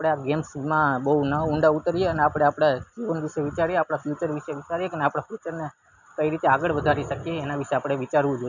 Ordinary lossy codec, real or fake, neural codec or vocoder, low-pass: none; real; none; 7.2 kHz